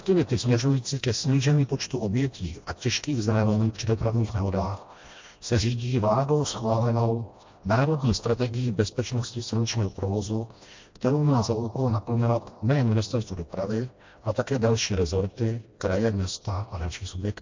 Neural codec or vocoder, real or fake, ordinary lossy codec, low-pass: codec, 16 kHz, 1 kbps, FreqCodec, smaller model; fake; MP3, 48 kbps; 7.2 kHz